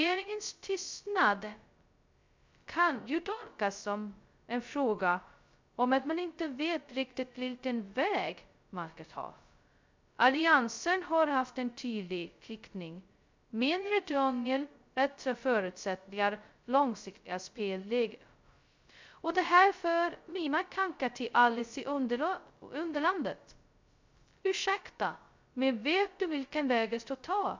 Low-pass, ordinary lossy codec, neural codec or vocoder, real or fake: 7.2 kHz; MP3, 64 kbps; codec, 16 kHz, 0.2 kbps, FocalCodec; fake